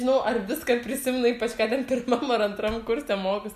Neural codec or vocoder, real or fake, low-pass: none; real; 14.4 kHz